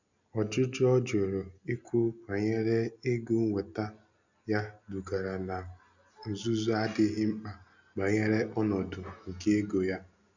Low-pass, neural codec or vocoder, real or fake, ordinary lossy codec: 7.2 kHz; none; real; none